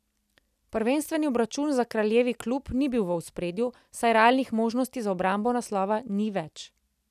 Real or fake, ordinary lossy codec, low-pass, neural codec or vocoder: real; none; 14.4 kHz; none